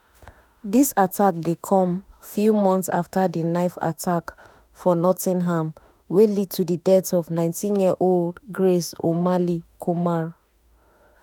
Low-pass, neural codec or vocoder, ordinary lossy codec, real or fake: none; autoencoder, 48 kHz, 32 numbers a frame, DAC-VAE, trained on Japanese speech; none; fake